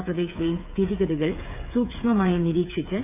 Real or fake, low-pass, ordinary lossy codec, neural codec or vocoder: fake; 3.6 kHz; none; codec, 16 kHz, 8 kbps, FreqCodec, smaller model